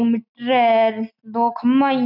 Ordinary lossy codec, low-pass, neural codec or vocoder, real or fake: none; 5.4 kHz; none; real